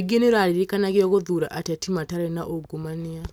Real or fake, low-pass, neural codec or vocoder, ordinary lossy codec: real; none; none; none